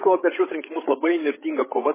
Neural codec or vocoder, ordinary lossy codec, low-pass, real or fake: codec, 16 kHz, 16 kbps, FreqCodec, larger model; MP3, 16 kbps; 3.6 kHz; fake